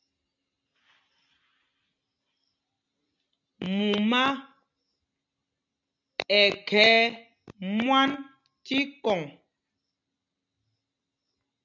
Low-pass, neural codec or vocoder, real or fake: 7.2 kHz; none; real